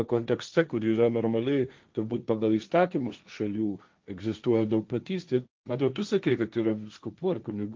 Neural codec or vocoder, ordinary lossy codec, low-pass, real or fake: codec, 16 kHz, 1.1 kbps, Voila-Tokenizer; Opus, 32 kbps; 7.2 kHz; fake